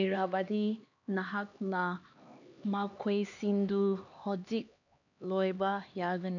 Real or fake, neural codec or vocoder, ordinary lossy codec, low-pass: fake; codec, 16 kHz, 2 kbps, X-Codec, HuBERT features, trained on LibriSpeech; none; 7.2 kHz